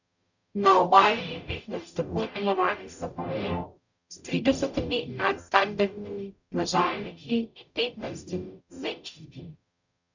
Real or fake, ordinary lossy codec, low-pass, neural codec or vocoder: fake; none; 7.2 kHz; codec, 44.1 kHz, 0.9 kbps, DAC